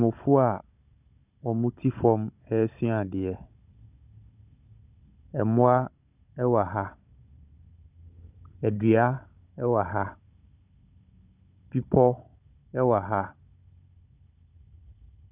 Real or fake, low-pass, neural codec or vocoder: fake; 3.6 kHz; codec, 16 kHz, 16 kbps, FunCodec, trained on Chinese and English, 50 frames a second